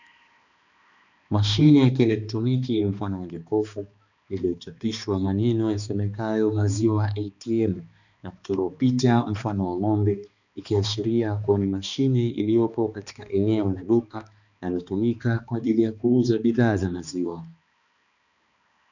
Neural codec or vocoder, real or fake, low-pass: codec, 16 kHz, 2 kbps, X-Codec, HuBERT features, trained on balanced general audio; fake; 7.2 kHz